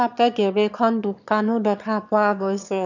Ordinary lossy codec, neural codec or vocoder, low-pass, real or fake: none; autoencoder, 22.05 kHz, a latent of 192 numbers a frame, VITS, trained on one speaker; 7.2 kHz; fake